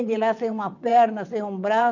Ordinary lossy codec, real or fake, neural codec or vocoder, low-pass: none; fake; vocoder, 22.05 kHz, 80 mel bands, WaveNeXt; 7.2 kHz